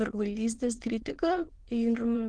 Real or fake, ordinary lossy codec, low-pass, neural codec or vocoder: fake; Opus, 16 kbps; 9.9 kHz; autoencoder, 22.05 kHz, a latent of 192 numbers a frame, VITS, trained on many speakers